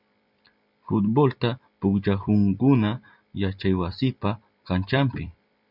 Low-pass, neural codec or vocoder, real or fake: 5.4 kHz; vocoder, 44.1 kHz, 128 mel bands every 256 samples, BigVGAN v2; fake